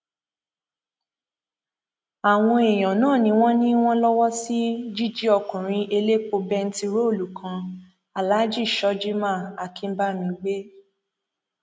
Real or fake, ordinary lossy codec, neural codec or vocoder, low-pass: real; none; none; none